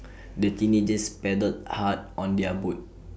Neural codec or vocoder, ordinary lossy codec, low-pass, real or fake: none; none; none; real